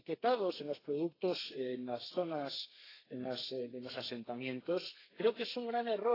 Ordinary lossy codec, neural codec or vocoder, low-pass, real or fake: AAC, 24 kbps; codec, 32 kHz, 1.9 kbps, SNAC; 5.4 kHz; fake